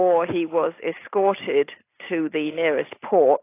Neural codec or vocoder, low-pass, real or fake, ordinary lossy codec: none; 3.6 kHz; real; AAC, 24 kbps